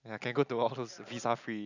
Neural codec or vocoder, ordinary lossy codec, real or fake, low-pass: none; none; real; 7.2 kHz